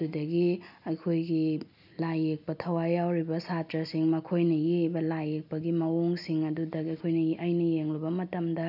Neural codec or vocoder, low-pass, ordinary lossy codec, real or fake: none; 5.4 kHz; none; real